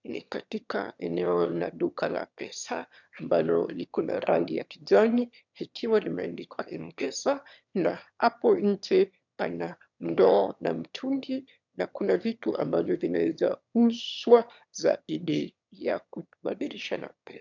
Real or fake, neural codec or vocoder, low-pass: fake; autoencoder, 22.05 kHz, a latent of 192 numbers a frame, VITS, trained on one speaker; 7.2 kHz